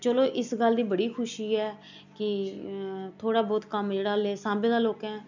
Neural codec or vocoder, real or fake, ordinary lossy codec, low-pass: none; real; none; 7.2 kHz